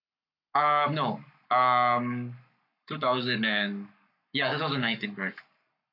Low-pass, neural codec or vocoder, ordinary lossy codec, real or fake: 5.4 kHz; codec, 44.1 kHz, 7.8 kbps, Pupu-Codec; AAC, 48 kbps; fake